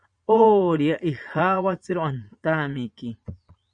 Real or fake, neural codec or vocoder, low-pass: fake; vocoder, 22.05 kHz, 80 mel bands, Vocos; 9.9 kHz